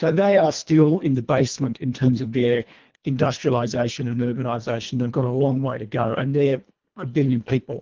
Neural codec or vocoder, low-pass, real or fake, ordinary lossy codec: codec, 24 kHz, 1.5 kbps, HILCodec; 7.2 kHz; fake; Opus, 32 kbps